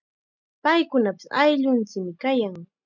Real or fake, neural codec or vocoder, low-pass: real; none; 7.2 kHz